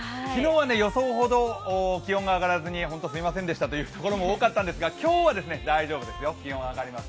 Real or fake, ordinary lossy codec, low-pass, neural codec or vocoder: real; none; none; none